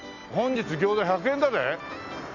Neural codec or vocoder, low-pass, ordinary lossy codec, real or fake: none; 7.2 kHz; none; real